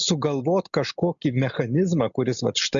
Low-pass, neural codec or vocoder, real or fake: 7.2 kHz; none; real